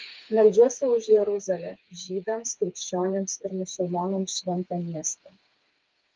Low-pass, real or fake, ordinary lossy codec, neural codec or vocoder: 7.2 kHz; fake; Opus, 32 kbps; codec, 16 kHz, 4 kbps, FreqCodec, smaller model